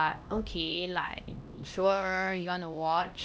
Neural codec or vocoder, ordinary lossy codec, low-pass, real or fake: codec, 16 kHz, 1 kbps, X-Codec, HuBERT features, trained on LibriSpeech; none; none; fake